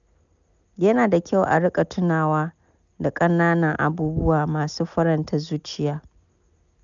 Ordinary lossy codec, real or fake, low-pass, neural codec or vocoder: none; real; 7.2 kHz; none